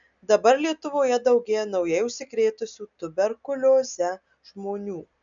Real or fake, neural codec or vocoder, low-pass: real; none; 7.2 kHz